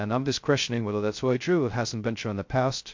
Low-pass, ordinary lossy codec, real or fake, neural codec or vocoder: 7.2 kHz; MP3, 48 kbps; fake; codec, 16 kHz, 0.2 kbps, FocalCodec